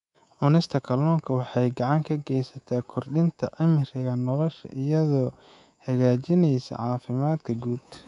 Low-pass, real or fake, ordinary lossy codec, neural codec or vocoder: 10.8 kHz; fake; none; codec, 24 kHz, 3.1 kbps, DualCodec